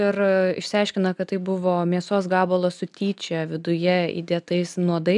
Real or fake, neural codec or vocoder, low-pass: real; none; 10.8 kHz